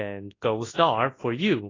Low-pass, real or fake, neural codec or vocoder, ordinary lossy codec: 7.2 kHz; fake; codec, 24 kHz, 0.9 kbps, WavTokenizer, large speech release; AAC, 32 kbps